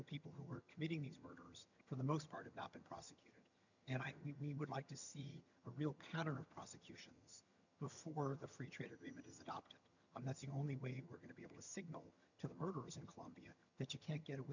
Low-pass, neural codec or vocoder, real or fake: 7.2 kHz; vocoder, 22.05 kHz, 80 mel bands, HiFi-GAN; fake